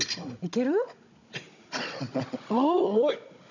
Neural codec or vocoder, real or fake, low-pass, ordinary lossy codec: codec, 16 kHz, 16 kbps, FunCodec, trained on Chinese and English, 50 frames a second; fake; 7.2 kHz; none